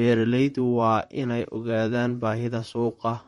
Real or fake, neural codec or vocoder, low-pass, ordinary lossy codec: fake; vocoder, 44.1 kHz, 128 mel bands, Pupu-Vocoder; 19.8 kHz; MP3, 48 kbps